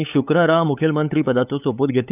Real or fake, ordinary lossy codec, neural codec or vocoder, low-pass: fake; none; codec, 16 kHz, 8 kbps, FunCodec, trained on LibriTTS, 25 frames a second; 3.6 kHz